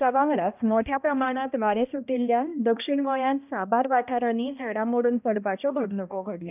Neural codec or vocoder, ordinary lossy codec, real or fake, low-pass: codec, 16 kHz, 1 kbps, X-Codec, HuBERT features, trained on balanced general audio; none; fake; 3.6 kHz